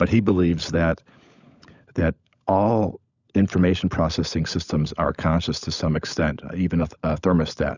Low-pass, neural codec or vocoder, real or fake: 7.2 kHz; codec, 16 kHz, 16 kbps, FunCodec, trained on LibriTTS, 50 frames a second; fake